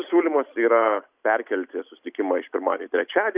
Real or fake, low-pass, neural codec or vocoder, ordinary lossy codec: real; 3.6 kHz; none; Opus, 64 kbps